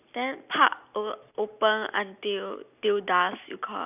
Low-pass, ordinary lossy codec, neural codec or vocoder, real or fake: 3.6 kHz; none; none; real